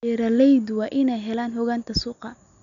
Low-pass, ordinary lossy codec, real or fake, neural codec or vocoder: 7.2 kHz; none; real; none